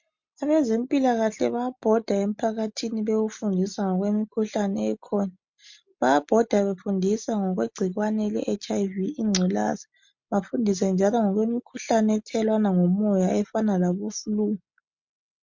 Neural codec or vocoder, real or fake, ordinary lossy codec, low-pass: none; real; MP3, 48 kbps; 7.2 kHz